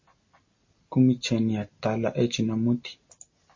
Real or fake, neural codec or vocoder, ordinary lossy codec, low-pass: real; none; MP3, 32 kbps; 7.2 kHz